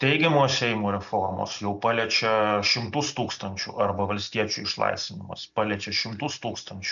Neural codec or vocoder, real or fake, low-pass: none; real; 7.2 kHz